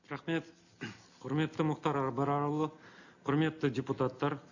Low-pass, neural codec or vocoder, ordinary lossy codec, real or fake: 7.2 kHz; none; none; real